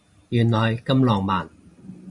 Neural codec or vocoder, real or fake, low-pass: vocoder, 44.1 kHz, 128 mel bands every 512 samples, BigVGAN v2; fake; 10.8 kHz